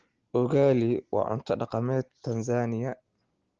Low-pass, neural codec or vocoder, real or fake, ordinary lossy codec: 7.2 kHz; none; real; Opus, 16 kbps